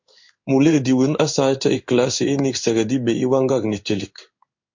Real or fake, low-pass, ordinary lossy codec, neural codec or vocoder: fake; 7.2 kHz; MP3, 48 kbps; codec, 16 kHz in and 24 kHz out, 1 kbps, XY-Tokenizer